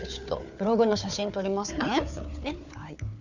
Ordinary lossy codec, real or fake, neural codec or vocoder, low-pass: none; fake; codec, 16 kHz, 4 kbps, FunCodec, trained on Chinese and English, 50 frames a second; 7.2 kHz